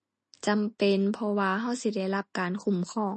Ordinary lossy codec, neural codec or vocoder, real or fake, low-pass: MP3, 32 kbps; none; real; 10.8 kHz